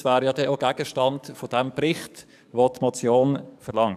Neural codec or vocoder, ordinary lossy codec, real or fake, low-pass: codec, 44.1 kHz, 7.8 kbps, DAC; none; fake; 14.4 kHz